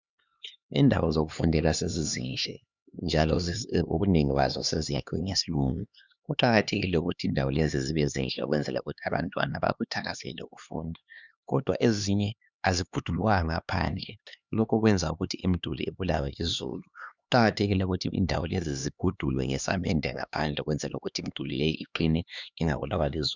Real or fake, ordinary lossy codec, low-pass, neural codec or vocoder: fake; Opus, 64 kbps; 7.2 kHz; codec, 16 kHz, 2 kbps, X-Codec, HuBERT features, trained on LibriSpeech